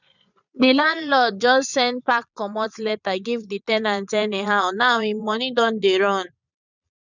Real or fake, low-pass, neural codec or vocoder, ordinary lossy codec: fake; 7.2 kHz; vocoder, 22.05 kHz, 80 mel bands, Vocos; none